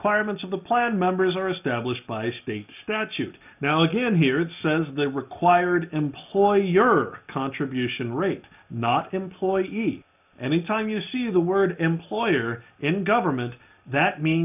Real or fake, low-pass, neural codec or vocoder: real; 3.6 kHz; none